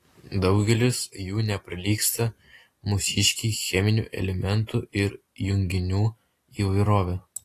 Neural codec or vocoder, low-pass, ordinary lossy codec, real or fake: none; 14.4 kHz; AAC, 48 kbps; real